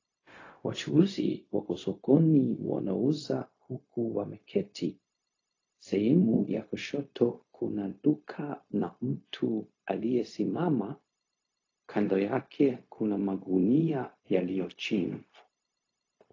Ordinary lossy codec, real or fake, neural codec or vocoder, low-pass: AAC, 32 kbps; fake; codec, 16 kHz, 0.4 kbps, LongCat-Audio-Codec; 7.2 kHz